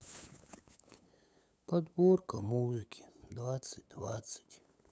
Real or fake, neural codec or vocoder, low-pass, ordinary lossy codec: fake; codec, 16 kHz, 8 kbps, FunCodec, trained on LibriTTS, 25 frames a second; none; none